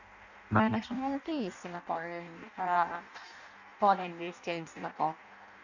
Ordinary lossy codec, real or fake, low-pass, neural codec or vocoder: none; fake; 7.2 kHz; codec, 16 kHz in and 24 kHz out, 0.6 kbps, FireRedTTS-2 codec